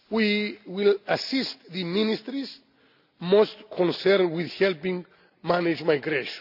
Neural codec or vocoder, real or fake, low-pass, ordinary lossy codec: none; real; 5.4 kHz; none